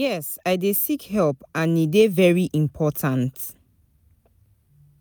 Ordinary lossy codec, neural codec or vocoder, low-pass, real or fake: none; none; none; real